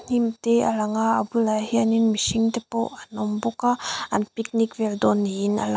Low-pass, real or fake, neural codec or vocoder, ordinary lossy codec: none; real; none; none